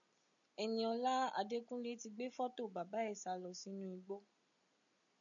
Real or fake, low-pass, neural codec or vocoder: real; 7.2 kHz; none